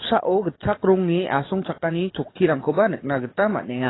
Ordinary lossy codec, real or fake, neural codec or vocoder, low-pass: AAC, 16 kbps; fake; codec, 44.1 kHz, 7.8 kbps, DAC; 7.2 kHz